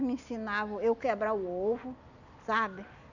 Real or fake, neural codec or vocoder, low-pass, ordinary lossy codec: real; none; 7.2 kHz; none